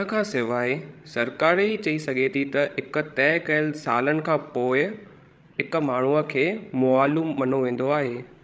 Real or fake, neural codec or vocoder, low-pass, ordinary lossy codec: fake; codec, 16 kHz, 16 kbps, FreqCodec, larger model; none; none